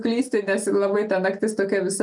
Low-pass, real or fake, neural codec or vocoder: 10.8 kHz; real; none